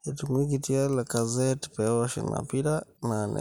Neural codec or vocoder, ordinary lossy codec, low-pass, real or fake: none; none; none; real